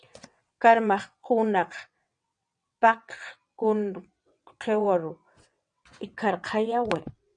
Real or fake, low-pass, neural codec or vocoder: fake; 9.9 kHz; vocoder, 22.05 kHz, 80 mel bands, WaveNeXt